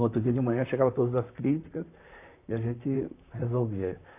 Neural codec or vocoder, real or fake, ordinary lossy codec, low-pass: vocoder, 44.1 kHz, 128 mel bands, Pupu-Vocoder; fake; AAC, 24 kbps; 3.6 kHz